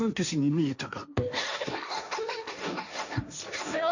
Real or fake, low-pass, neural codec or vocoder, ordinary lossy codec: fake; 7.2 kHz; codec, 16 kHz, 1.1 kbps, Voila-Tokenizer; none